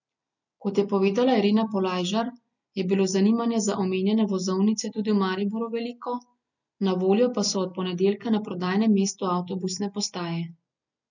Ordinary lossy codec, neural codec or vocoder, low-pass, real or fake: none; none; 7.2 kHz; real